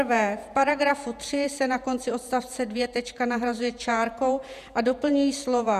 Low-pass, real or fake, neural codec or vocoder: 14.4 kHz; fake; vocoder, 48 kHz, 128 mel bands, Vocos